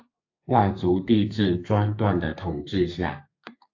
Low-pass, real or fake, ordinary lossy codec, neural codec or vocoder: 7.2 kHz; fake; AAC, 48 kbps; codec, 32 kHz, 1.9 kbps, SNAC